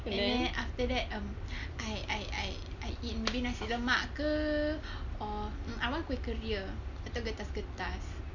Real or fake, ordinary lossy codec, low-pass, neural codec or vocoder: real; none; 7.2 kHz; none